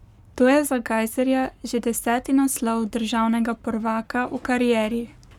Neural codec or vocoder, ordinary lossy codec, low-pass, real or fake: codec, 44.1 kHz, 7.8 kbps, Pupu-Codec; none; 19.8 kHz; fake